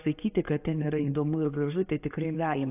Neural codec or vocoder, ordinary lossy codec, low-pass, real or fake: none; AAC, 32 kbps; 3.6 kHz; real